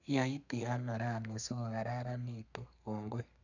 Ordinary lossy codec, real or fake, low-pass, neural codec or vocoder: none; fake; 7.2 kHz; codec, 32 kHz, 1.9 kbps, SNAC